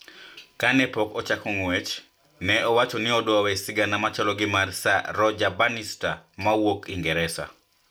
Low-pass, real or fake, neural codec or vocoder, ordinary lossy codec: none; real; none; none